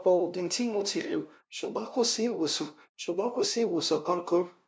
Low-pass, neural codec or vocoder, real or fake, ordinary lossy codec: none; codec, 16 kHz, 0.5 kbps, FunCodec, trained on LibriTTS, 25 frames a second; fake; none